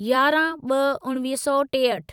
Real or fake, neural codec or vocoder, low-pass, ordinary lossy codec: fake; vocoder, 44.1 kHz, 128 mel bands every 256 samples, BigVGAN v2; 19.8 kHz; none